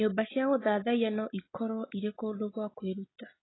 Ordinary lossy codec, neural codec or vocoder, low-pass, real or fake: AAC, 16 kbps; none; 7.2 kHz; real